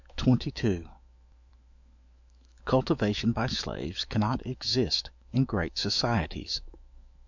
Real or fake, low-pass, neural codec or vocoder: fake; 7.2 kHz; autoencoder, 48 kHz, 128 numbers a frame, DAC-VAE, trained on Japanese speech